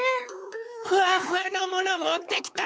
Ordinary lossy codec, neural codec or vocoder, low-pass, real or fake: none; codec, 16 kHz, 4 kbps, X-Codec, WavLM features, trained on Multilingual LibriSpeech; none; fake